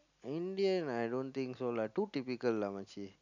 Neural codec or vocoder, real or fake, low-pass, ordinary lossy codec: none; real; 7.2 kHz; none